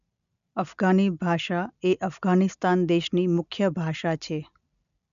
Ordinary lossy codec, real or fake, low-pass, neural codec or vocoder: none; real; 7.2 kHz; none